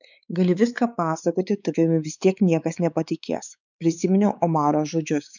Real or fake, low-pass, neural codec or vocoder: fake; 7.2 kHz; codec, 16 kHz, 4 kbps, X-Codec, WavLM features, trained on Multilingual LibriSpeech